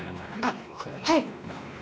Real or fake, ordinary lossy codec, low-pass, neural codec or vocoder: fake; none; none; codec, 16 kHz, 1 kbps, X-Codec, WavLM features, trained on Multilingual LibriSpeech